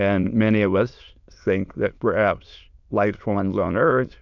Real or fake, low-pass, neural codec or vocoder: fake; 7.2 kHz; autoencoder, 22.05 kHz, a latent of 192 numbers a frame, VITS, trained on many speakers